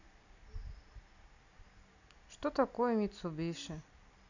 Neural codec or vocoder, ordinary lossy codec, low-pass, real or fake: none; none; 7.2 kHz; real